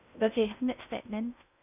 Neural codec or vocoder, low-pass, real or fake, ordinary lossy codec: codec, 16 kHz in and 24 kHz out, 0.6 kbps, FocalCodec, streaming, 4096 codes; 3.6 kHz; fake; none